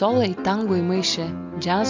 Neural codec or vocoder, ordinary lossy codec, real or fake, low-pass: none; MP3, 64 kbps; real; 7.2 kHz